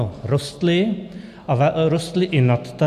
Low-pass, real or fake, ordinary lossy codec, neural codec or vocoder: 14.4 kHz; real; MP3, 96 kbps; none